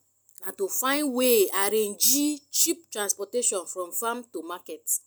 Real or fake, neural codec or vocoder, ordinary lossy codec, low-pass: real; none; none; none